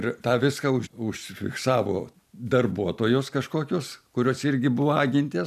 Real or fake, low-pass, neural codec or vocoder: real; 14.4 kHz; none